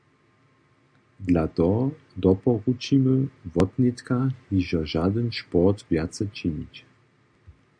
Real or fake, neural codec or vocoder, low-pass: real; none; 9.9 kHz